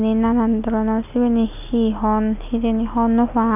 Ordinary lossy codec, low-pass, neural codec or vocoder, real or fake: none; 3.6 kHz; none; real